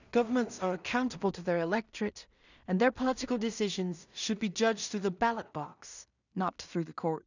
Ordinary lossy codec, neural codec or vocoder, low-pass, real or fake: none; codec, 16 kHz in and 24 kHz out, 0.4 kbps, LongCat-Audio-Codec, two codebook decoder; 7.2 kHz; fake